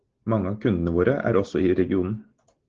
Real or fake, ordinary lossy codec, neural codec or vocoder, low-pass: real; Opus, 16 kbps; none; 7.2 kHz